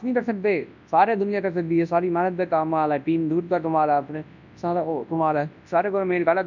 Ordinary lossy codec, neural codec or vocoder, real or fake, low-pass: none; codec, 24 kHz, 0.9 kbps, WavTokenizer, large speech release; fake; 7.2 kHz